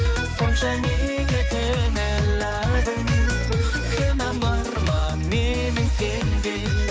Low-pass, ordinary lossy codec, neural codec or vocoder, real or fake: none; none; codec, 16 kHz, 4 kbps, X-Codec, HuBERT features, trained on balanced general audio; fake